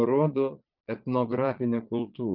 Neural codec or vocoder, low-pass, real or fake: vocoder, 22.05 kHz, 80 mel bands, Vocos; 5.4 kHz; fake